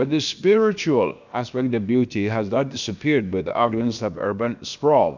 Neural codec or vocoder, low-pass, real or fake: codec, 16 kHz, about 1 kbps, DyCAST, with the encoder's durations; 7.2 kHz; fake